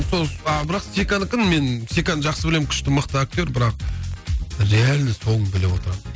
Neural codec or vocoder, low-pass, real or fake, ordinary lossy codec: none; none; real; none